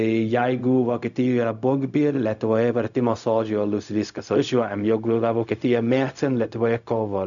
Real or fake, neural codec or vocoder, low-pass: fake; codec, 16 kHz, 0.4 kbps, LongCat-Audio-Codec; 7.2 kHz